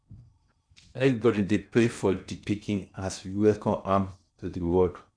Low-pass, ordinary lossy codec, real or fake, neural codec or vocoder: 9.9 kHz; none; fake; codec, 16 kHz in and 24 kHz out, 0.6 kbps, FocalCodec, streaming, 4096 codes